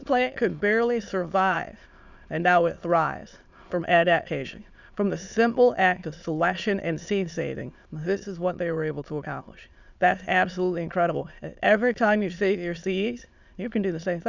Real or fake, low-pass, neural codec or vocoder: fake; 7.2 kHz; autoencoder, 22.05 kHz, a latent of 192 numbers a frame, VITS, trained on many speakers